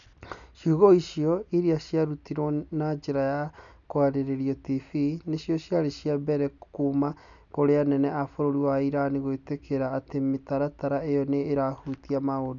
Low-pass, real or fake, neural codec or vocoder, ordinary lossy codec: 7.2 kHz; real; none; none